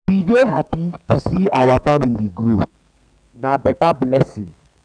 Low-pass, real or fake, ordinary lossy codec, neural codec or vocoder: 9.9 kHz; fake; none; codec, 32 kHz, 1.9 kbps, SNAC